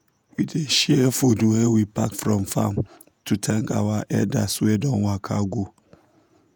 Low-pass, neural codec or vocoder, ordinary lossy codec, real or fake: none; vocoder, 48 kHz, 128 mel bands, Vocos; none; fake